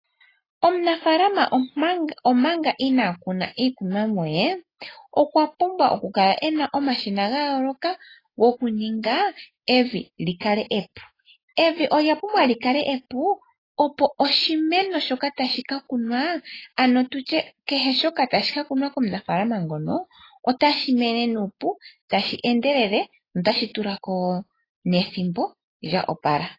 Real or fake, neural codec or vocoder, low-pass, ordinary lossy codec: real; none; 5.4 kHz; AAC, 24 kbps